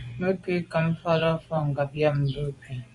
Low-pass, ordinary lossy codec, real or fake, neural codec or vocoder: 10.8 kHz; Opus, 64 kbps; fake; vocoder, 24 kHz, 100 mel bands, Vocos